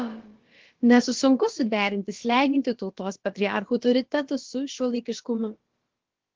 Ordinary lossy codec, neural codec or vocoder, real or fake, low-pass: Opus, 16 kbps; codec, 16 kHz, about 1 kbps, DyCAST, with the encoder's durations; fake; 7.2 kHz